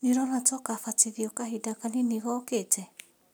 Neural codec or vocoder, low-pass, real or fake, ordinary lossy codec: none; none; real; none